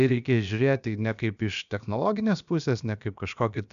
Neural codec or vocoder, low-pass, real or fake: codec, 16 kHz, about 1 kbps, DyCAST, with the encoder's durations; 7.2 kHz; fake